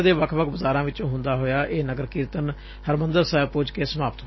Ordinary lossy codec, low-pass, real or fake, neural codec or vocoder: MP3, 24 kbps; 7.2 kHz; real; none